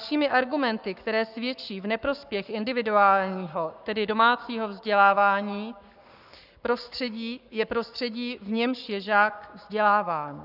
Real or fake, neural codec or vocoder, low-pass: fake; codec, 44.1 kHz, 7.8 kbps, Pupu-Codec; 5.4 kHz